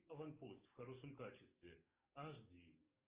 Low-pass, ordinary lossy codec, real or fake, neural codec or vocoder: 3.6 kHz; Opus, 24 kbps; fake; codec, 44.1 kHz, 7.8 kbps, DAC